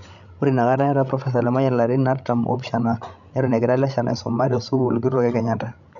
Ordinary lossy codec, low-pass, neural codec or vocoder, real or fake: none; 7.2 kHz; codec, 16 kHz, 16 kbps, FreqCodec, larger model; fake